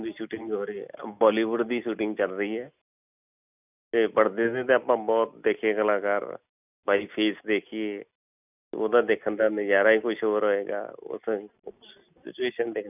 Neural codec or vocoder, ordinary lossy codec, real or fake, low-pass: none; none; real; 3.6 kHz